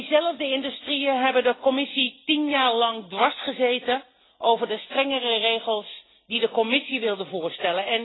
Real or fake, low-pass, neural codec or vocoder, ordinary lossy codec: real; 7.2 kHz; none; AAC, 16 kbps